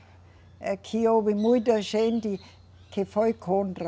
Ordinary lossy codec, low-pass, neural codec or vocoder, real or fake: none; none; none; real